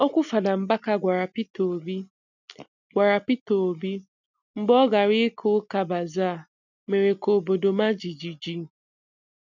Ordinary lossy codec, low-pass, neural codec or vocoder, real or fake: none; 7.2 kHz; none; real